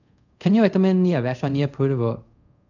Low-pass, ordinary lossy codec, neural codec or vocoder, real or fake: 7.2 kHz; none; codec, 24 kHz, 0.5 kbps, DualCodec; fake